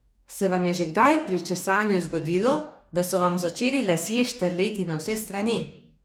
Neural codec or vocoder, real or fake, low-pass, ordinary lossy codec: codec, 44.1 kHz, 2.6 kbps, DAC; fake; none; none